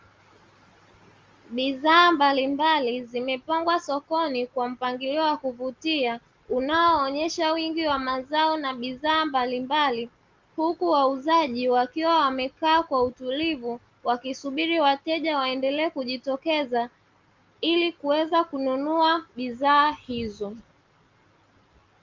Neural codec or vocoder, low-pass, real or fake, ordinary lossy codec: none; 7.2 kHz; real; Opus, 32 kbps